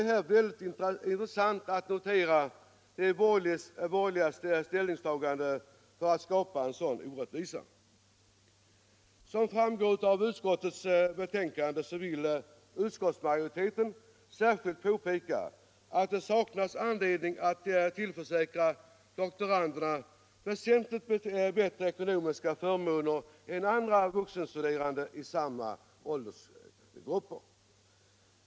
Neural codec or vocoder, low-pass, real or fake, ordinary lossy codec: none; none; real; none